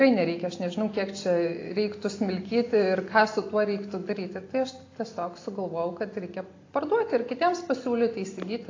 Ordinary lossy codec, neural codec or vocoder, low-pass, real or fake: AAC, 32 kbps; none; 7.2 kHz; real